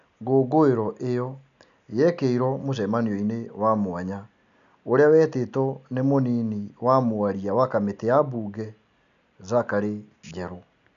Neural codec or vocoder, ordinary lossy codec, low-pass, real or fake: none; none; 7.2 kHz; real